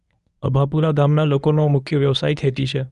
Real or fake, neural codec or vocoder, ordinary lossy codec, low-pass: fake; codec, 24 kHz, 0.9 kbps, WavTokenizer, medium speech release version 1; none; 10.8 kHz